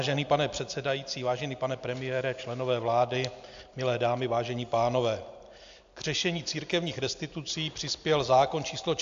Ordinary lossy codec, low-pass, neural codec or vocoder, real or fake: AAC, 64 kbps; 7.2 kHz; none; real